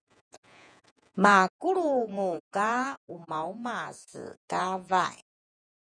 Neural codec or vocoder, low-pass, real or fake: vocoder, 48 kHz, 128 mel bands, Vocos; 9.9 kHz; fake